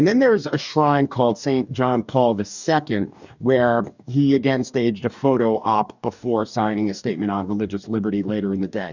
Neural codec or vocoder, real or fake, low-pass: codec, 44.1 kHz, 2.6 kbps, DAC; fake; 7.2 kHz